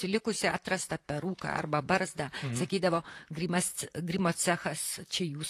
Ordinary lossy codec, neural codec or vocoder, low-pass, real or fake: AAC, 48 kbps; vocoder, 44.1 kHz, 128 mel bands, Pupu-Vocoder; 14.4 kHz; fake